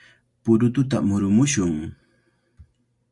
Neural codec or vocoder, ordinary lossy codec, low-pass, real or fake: none; Opus, 64 kbps; 10.8 kHz; real